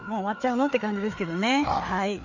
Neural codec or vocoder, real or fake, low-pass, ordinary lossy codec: codec, 16 kHz, 4 kbps, FreqCodec, larger model; fake; 7.2 kHz; none